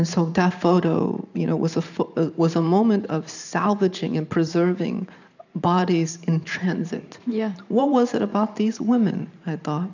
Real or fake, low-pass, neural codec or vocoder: fake; 7.2 kHz; vocoder, 44.1 kHz, 128 mel bands every 512 samples, BigVGAN v2